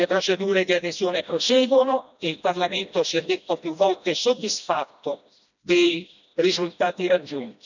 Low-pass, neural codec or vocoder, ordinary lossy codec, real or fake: 7.2 kHz; codec, 16 kHz, 1 kbps, FreqCodec, smaller model; none; fake